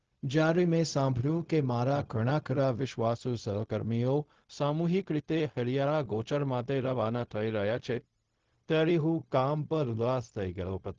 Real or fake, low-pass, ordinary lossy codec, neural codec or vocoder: fake; 7.2 kHz; Opus, 16 kbps; codec, 16 kHz, 0.4 kbps, LongCat-Audio-Codec